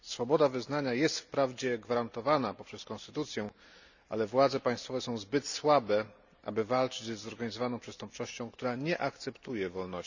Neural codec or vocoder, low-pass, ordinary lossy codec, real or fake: none; 7.2 kHz; none; real